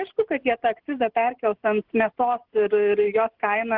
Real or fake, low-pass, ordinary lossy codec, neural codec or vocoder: real; 5.4 kHz; Opus, 16 kbps; none